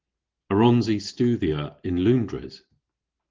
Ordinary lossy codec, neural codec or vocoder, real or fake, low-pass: Opus, 16 kbps; none; real; 7.2 kHz